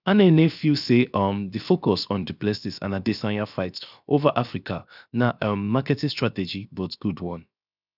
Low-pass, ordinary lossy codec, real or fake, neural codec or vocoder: 5.4 kHz; none; fake; codec, 16 kHz, 0.7 kbps, FocalCodec